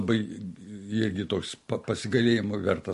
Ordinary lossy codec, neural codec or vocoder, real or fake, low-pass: MP3, 48 kbps; none; real; 14.4 kHz